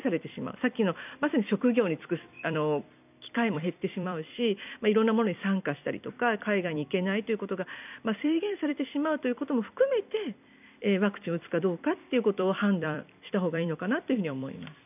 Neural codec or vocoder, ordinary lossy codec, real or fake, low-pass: vocoder, 44.1 kHz, 128 mel bands every 256 samples, BigVGAN v2; none; fake; 3.6 kHz